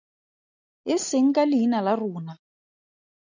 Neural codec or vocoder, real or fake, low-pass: none; real; 7.2 kHz